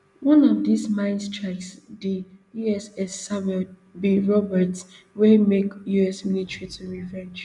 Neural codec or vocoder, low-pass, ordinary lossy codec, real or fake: none; 10.8 kHz; none; real